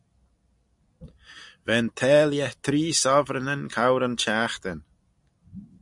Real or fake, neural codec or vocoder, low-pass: real; none; 10.8 kHz